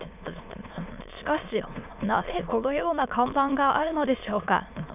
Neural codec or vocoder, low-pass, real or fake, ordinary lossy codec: autoencoder, 22.05 kHz, a latent of 192 numbers a frame, VITS, trained on many speakers; 3.6 kHz; fake; none